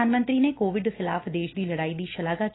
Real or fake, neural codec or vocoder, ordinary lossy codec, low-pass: real; none; AAC, 16 kbps; 7.2 kHz